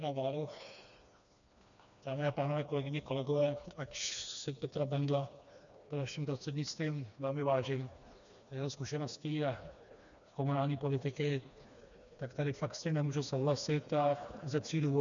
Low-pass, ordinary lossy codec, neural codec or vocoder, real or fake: 7.2 kHz; MP3, 96 kbps; codec, 16 kHz, 2 kbps, FreqCodec, smaller model; fake